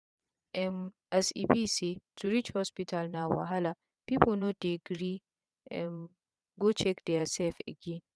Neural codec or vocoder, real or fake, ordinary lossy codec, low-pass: vocoder, 22.05 kHz, 80 mel bands, Vocos; fake; none; none